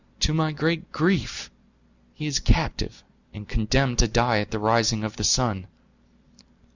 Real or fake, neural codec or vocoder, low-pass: real; none; 7.2 kHz